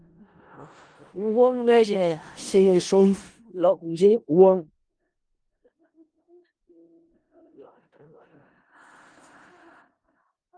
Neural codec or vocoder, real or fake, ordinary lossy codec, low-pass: codec, 16 kHz in and 24 kHz out, 0.4 kbps, LongCat-Audio-Codec, four codebook decoder; fake; Opus, 24 kbps; 9.9 kHz